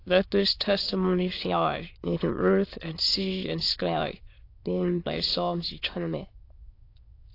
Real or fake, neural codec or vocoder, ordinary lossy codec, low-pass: fake; autoencoder, 22.05 kHz, a latent of 192 numbers a frame, VITS, trained on many speakers; AAC, 32 kbps; 5.4 kHz